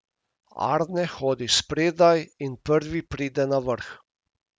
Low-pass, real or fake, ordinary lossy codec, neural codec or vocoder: none; real; none; none